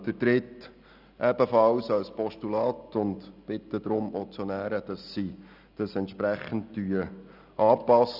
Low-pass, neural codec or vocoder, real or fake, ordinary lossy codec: 5.4 kHz; none; real; none